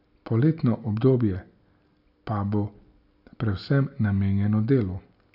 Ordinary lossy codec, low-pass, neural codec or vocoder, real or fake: none; 5.4 kHz; none; real